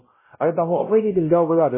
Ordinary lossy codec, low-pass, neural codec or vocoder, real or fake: MP3, 16 kbps; 3.6 kHz; codec, 16 kHz, 0.5 kbps, X-Codec, WavLM features, trained on Multilingual LibriSpeech; fake